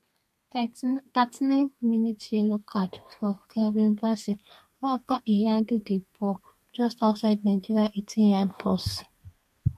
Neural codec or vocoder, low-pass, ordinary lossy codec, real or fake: codec, 32 kHz, 1.9 kbps, SNAC; 14.4 kHz; MP3, 64 kbps; fake